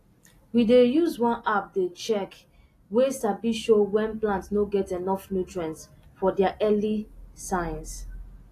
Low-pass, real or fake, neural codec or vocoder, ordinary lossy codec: 14.4 kHz; real; none; AAC, 48 kbps